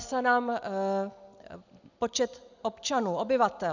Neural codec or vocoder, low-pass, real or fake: none; 7.2 kHz; real